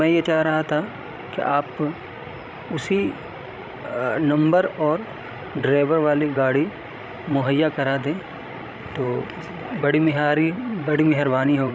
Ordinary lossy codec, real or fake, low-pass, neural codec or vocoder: none; fake; none; codec, 16 kHz, 16 kbps, FreqCodec, larger model